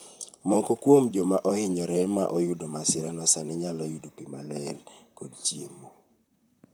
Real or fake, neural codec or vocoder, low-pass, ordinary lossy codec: fake; vocoder, 44.1 kHz, 128 mel bands, Pupu-Vocoder; none; none